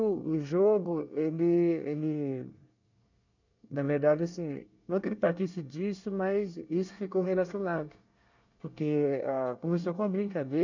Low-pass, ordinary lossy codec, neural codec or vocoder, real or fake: 7.2 kHz; none; codec, 24 kHz, 1 kbps, SNAC; fake